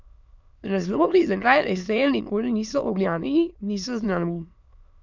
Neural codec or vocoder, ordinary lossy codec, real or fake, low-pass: autoencoder, 22.05 kHz, a latent of 192 numbers a frame, VITS, trained on many speakers; none; fake; 7.2 kHz